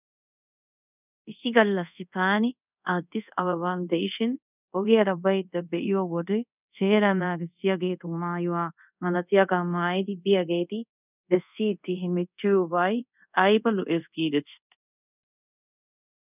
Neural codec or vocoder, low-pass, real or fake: codec, 24 kHz, 0.5 kbps, DualCodec; 3.6 kHz; fake